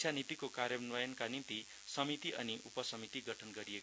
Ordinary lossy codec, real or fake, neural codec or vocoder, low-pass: none; real; none; none